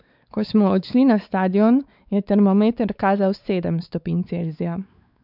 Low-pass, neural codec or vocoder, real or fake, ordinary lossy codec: 5.4 kHz; codec, 16 kHz, 4 kbps, X-Codec, WavLM features, trained on Multilingual LibriSpeech; fake; none